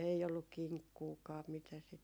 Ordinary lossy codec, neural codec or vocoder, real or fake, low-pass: none; none; real; none